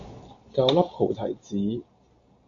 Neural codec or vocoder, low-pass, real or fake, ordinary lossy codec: none; 7.2 kHz; real; AAC, 48 kbps